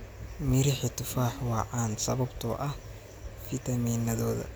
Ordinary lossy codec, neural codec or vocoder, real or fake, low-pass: none; none; real; none